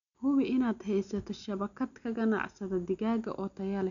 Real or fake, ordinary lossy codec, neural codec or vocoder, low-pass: real; none; none; 7.2 kHz